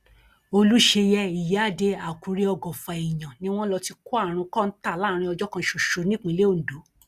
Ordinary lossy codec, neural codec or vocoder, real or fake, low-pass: Opus, 64 kbps; none; real; 14.4 kHz